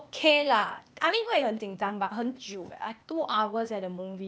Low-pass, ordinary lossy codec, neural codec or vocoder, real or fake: none; none; codec, 16 kHz, 0.8 kbps, ZipCodec; fake